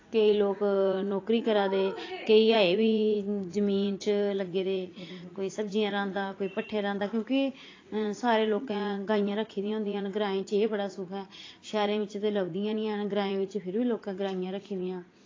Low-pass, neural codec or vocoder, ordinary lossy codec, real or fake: 7.2 kHz; vocoder, 44.1 kHz, 80 mel bands, Vocos; AAC, 32 kbps; fake